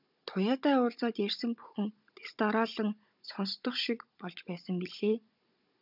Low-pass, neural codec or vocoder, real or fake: 5.4 kHz; codec, 16 kHz, 16 kbps, FunCodec, trained on Chinese and English, 50 frames a second; fake